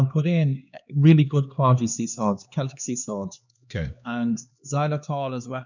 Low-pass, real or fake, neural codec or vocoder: 7.2 kHz; fake; codec, 16 kHz, 2 kbps, X-Codec, HuBERT features, trained on LibriSpeech